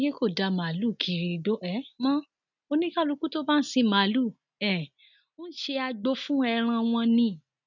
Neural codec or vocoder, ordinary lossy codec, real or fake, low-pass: none; none; real; 7.2 kHz